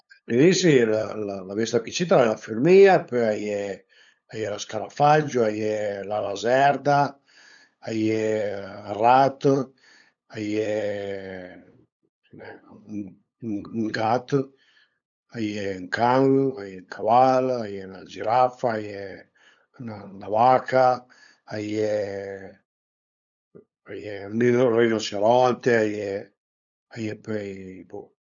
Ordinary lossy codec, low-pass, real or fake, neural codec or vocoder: none; 7.2 kHz; fake; codec, 16 kHz, 8 kbps, FunCodec, trained on LibriTTS, 25 frames a second